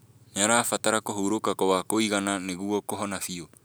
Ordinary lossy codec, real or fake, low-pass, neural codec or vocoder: none; real; none; none